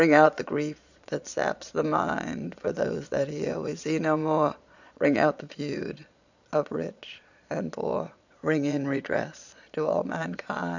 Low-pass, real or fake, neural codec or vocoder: 7.2 kHz; fake; vocoder, 22.05 kHz, 80 mel bands, Vocos